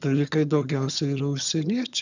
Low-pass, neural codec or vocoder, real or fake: 7.2 kHz; vocoder, 22.05 kHz, 80 mel bands, HiFi-GAN; fake